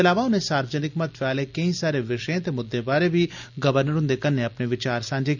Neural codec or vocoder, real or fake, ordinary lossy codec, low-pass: none; real; none; 7.2 kHz